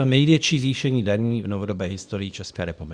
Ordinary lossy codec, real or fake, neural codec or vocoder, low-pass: Opus, 64 kbps; fake; codec, 24 kHz, 0.9 kbps, WavTokenizer, small release; 9.9 kHz